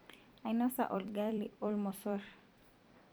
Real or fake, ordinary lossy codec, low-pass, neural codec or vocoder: fake; none; none; vocoder, 44.1 kHz, 128 mel bands every 256 samples, BigVGAN v2